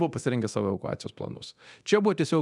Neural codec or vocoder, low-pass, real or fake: codec, 24 kHz, 0.9 kbps, DualCodec; 10.8 kHz; fake